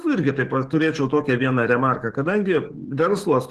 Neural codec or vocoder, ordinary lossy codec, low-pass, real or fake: codec, 44.1 kHz, 7.8 kbps, DAC; Opus, 16 kbps; 14.4 kHz; fake